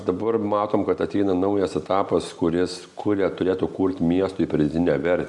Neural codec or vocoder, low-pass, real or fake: none; 10.8 kHz; real